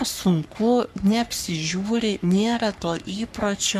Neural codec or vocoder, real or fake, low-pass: codec, 44.1 kHz, 3.4 kbps, Pupu-Codec; fake; 14.4 kHz